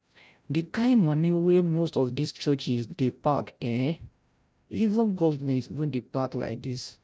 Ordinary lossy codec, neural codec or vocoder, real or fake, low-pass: none; codec, 16 kHz, 0.5 kbps, FreqCodec, larger model; fake; none